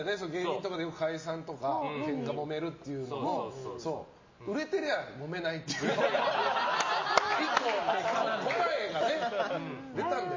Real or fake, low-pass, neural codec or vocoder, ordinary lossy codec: real; 7.2 kHz; none; MP3, 32 kbps